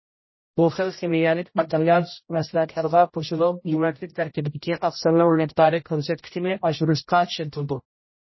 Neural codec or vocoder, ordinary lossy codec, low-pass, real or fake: codec, 16 kHz, 0.5 kbps, X-Codec, HuBERT features, trained on general audio; MP3, 24 kbps; 7.2 kHz; fake